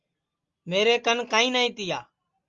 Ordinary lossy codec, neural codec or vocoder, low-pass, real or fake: Opus, 32 kbps; none; 7.2 kHz; real